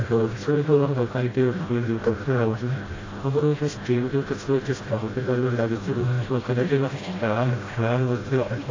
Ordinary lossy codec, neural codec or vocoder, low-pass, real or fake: AAC, 32 kbps; codec, 16 kHz, 0.5 kbps, FreqCodec, smaller model; 7.2 kHz; fake